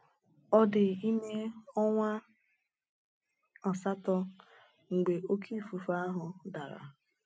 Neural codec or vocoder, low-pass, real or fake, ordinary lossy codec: none; none; real; none